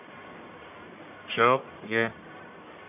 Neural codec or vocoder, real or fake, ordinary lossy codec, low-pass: codec, 44.1 kHz, 1.7 kbps, Pupu-Codec; fake; none; 3.6 kHz